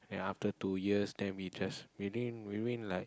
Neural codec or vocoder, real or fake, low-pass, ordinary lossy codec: none; real; none; none